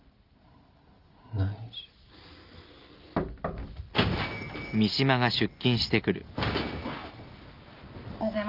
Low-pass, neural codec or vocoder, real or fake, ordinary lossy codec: 5.4 kHz; none; real; Opus, 24 kbps